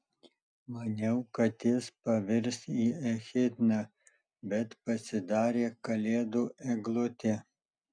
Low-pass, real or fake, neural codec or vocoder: 9.9 kHz; real; none